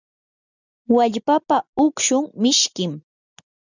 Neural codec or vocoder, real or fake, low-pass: none; real; 7.2 kHz